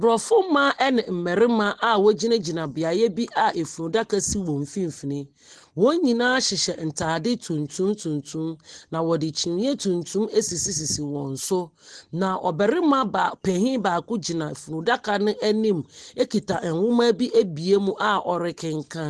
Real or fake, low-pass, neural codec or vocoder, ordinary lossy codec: real; 10.8 kHz; none; Opus, 16 kbps